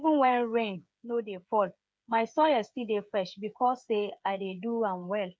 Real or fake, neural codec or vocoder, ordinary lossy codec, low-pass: fake; codec, 16 kHz, 8 kbps, FreqCodec, smaller model; none; none